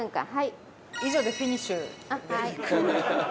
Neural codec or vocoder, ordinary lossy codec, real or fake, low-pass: none; none; real; none